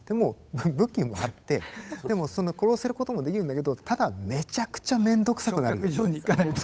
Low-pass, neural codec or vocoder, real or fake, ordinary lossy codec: none; codec, 16 kHz, 8 kbps, FunCodec, trained on Chinese and English, 25 frames a second; fake; none